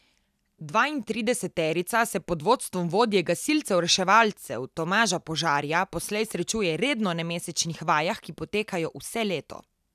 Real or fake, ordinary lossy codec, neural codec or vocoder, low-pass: real; none; none; 14.4 kHz